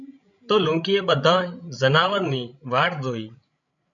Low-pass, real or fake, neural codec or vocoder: 7.2 kHz; fake; codec, 16 kHz, 16 kbps, FreqCodec, larger model